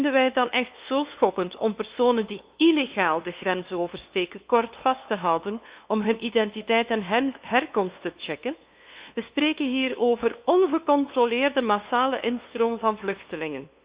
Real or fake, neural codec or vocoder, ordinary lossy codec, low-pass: fake; codec, 16 kHz, 2 kbps, FunCodec, trained on LibriTTS, 25 frames a second; Opus, 64 kbps; 3.6 kHz